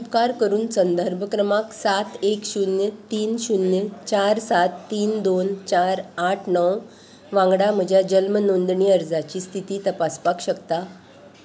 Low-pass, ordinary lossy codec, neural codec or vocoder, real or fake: none; none; none; real